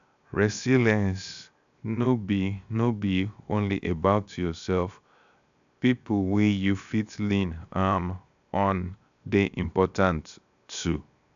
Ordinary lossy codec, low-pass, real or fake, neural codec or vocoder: AAC, 96 kbps; 7.2 kHz; fake; codec, 16 kHz, 0.7 kbps, FocalCodec